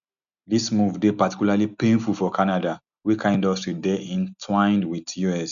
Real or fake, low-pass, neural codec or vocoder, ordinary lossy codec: real; 7.2 kHz; none; none